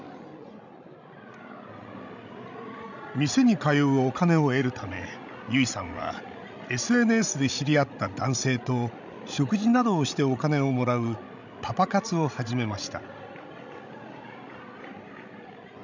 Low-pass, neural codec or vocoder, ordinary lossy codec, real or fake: 7.2 kHz; codec, 16 kHz, 16 kbps, FreqCodec, larger model; none; fake